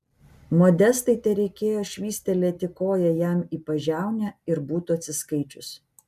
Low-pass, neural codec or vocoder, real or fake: 14.4 kHz; none; real